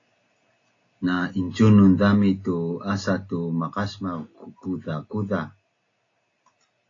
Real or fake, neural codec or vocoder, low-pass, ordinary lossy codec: real; none; 7.2 kHz; AAC, 32 kbps